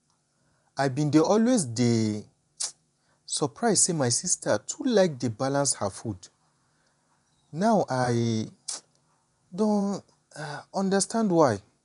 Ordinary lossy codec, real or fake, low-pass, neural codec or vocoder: none; fake; 10.8 kHz; vocoder, 24 kHz, 100 mel bands, Vocos